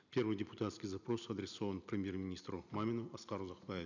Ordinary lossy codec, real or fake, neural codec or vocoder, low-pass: none; real; none; 7.2 kHz